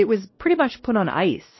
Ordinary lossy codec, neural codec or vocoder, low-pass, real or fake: MP3, 24 kbps; codec, 16 kHz, 1 kbps, X-Codec, WavLM features, trained on Multilingual LibriSpeech; 7.2 kHz; fake